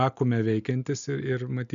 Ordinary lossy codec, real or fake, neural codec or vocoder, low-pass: MP3, 96 kbps; real; none; 7.2 kHz